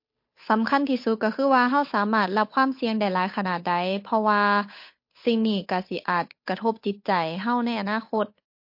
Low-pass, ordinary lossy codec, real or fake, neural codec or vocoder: 5.4 kHz; MP3, 32 kbps; fake; codec, 16 kHz, 8 kbps, FunCodec, trained on Chinese and English, 25 frames a second